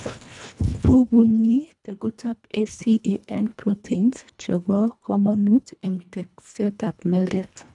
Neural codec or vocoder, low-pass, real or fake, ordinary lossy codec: codec, 24 kHz, 1.5 kbps, HILCodec; 10.8 kHz; fake; none